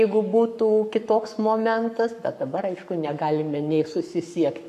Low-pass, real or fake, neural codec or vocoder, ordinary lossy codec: 14.4 kHz; fake; codec, 44.1 kHz, 7.8 kbps, Pupu-Codec; AAC, 96 kbps